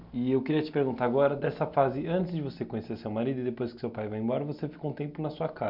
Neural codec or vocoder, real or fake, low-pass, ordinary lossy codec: none; real; 5.4 kHz; none